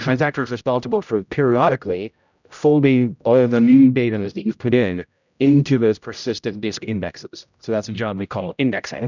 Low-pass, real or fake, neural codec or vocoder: 7.2 kHz; fake; codec, 16 kHz, 0.5 kbps, X-Codec, HuBERT features, trained on general audio